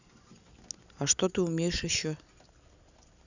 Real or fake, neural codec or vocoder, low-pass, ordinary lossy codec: real; none; 7.2 kHz; none